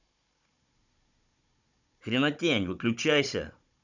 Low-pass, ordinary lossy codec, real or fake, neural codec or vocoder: 7.2 kHz; none; fake; codec, 16 kHz, 16 kbps, FunCodec, trained on Chinese and English, 50 frames a second